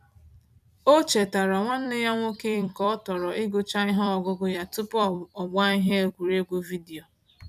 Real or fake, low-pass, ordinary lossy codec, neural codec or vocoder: fake; 14.4 kHz; none; vocoder, 44.1 kHz, 128 mel bands every 256 samples, BigVGAN v2